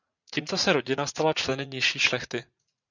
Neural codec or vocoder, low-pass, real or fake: vocoder, 44.1 kHz, 80 mel bands, Vocos; 7.2 kHz; fake